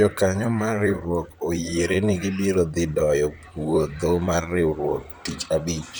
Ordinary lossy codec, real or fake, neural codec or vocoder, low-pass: none; fake; vocoder, 44.1 kHz, 128 mel bands, Pupu-Vocoder; none